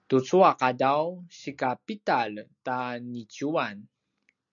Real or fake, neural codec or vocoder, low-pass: real; none; 7.2 kHz